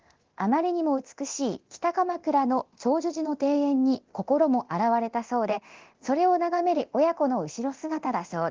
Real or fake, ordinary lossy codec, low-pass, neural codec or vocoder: fake; Opus, 16 kbps; 7.2 kHz; codec, 24 kHz, 0.9 kbps, DualCodec